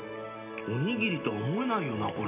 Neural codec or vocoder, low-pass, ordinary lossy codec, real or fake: none; 3.6 kHz; AAC, 24 kbps; real